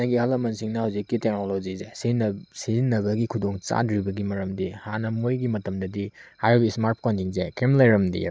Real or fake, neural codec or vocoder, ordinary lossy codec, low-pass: real; none; none; none